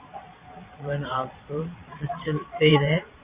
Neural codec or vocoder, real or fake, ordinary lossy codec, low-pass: vocoder, 22.05 kHz, 80 mel bands, Vocos; fake; Opus, 64 kbps; 3.6 kHz